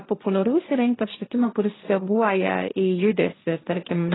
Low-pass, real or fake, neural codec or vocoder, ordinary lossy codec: 7.2 kHz; fake; codec, 16 kHz, 1.1 kbps, Voila-Tokenizer; AAC, 16 kbps